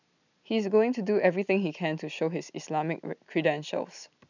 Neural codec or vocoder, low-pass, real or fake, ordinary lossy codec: none; 7.2 kHz; real; none